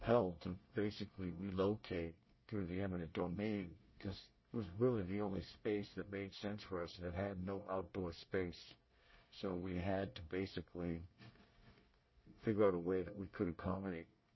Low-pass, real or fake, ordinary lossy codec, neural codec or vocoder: 7.2 kHz; fake; MP3, 24 kbps; codec, 24 kHz, 1 kbps, SNAC